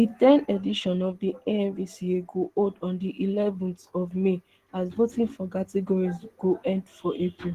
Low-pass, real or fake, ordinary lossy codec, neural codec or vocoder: 14.4 kHz; real; Opus, 16 kbps; none